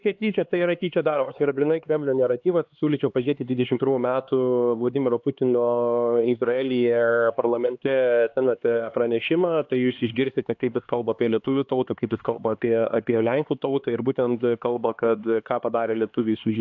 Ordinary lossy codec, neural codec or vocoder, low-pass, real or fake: AAC, 48 kbps; codec, 16 kHz, 2 kbps, X-Codec, HuBERT features, trained on LibriSpeech; 7.2 kHz; fake